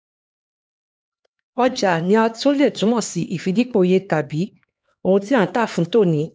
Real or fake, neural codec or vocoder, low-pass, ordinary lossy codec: fake; codec, 16 kHz, 2 kbps, X-Codec, HuBERT features, trained on LibriSpeech; none; none